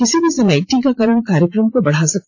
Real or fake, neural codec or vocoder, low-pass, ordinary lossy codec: fake; vocoder, 44.1 kHz, 80 mel bands, Vocos; 7.2 kHz; AAC, 48 kbps